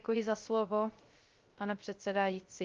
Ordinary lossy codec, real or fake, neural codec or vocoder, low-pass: Opus, 32 kbps; fake; codec, 16 kHz, 0.3 kbps, FocalCodec; 7.2 kHz